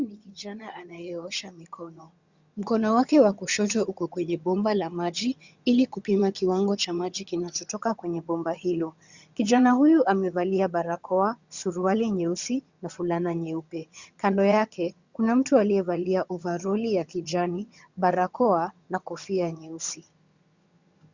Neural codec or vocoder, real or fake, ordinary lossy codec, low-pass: vocoder, 22.05 kHz, 80 mel bands, HiFi-GAN; fake; Opus, 64 kbps; 7.2 kHz